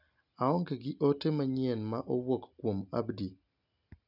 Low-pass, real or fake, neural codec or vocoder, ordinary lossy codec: 5.4 kHz; real; none; none